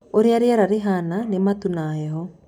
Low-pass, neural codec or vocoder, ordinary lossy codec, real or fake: 19.8 kHz; none; none; real